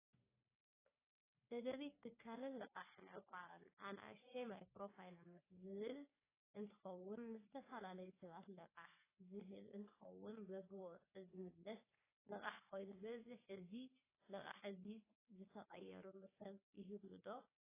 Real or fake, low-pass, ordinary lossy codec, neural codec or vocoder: fake; 3.6 kHz; AAC, 16 kbps; codec, 44.1 kHz, 3.4 kbps, Pupu-Codec